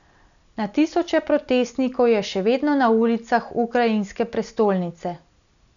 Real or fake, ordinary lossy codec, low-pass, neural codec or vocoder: real; none; 7.2 kHz; none